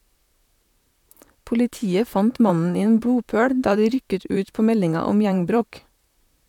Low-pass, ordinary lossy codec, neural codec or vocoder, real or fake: 19.8 kHz; none; vocoder, 44.1 kHz, 128 mel bands, Pupu-Vocoder; fake